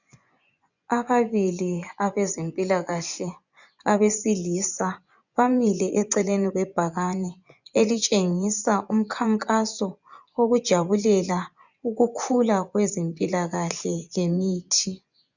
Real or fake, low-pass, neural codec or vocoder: fake; 7.2 kHz; vocoder, 24 kHz, 100 mel bands, Vocos